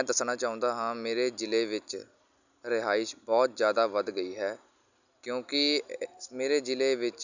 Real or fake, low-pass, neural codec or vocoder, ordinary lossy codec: real; 7.2 kHz; none; none